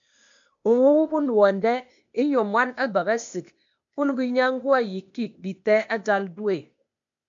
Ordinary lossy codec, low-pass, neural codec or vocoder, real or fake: MP3, 64 kbps; 7.2 kHz; codec, 16 kHz, 0.8 kbps, ZipCodec; fake